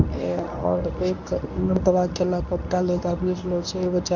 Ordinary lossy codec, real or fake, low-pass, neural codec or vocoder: none; fake; 7.2 kHz; codec, 24 kHz, 0.9 kbps, WavTokenizer, medium speech release version 1